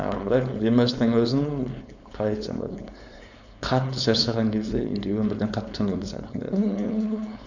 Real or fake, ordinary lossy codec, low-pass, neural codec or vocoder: fake; none; 7.2 kHz; codec, 16 kHz, 4.8 kbps, FACodec